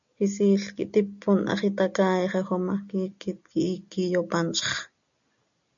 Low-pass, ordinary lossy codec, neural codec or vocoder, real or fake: 7.2 kHz; MP3, 96 kbps; none; real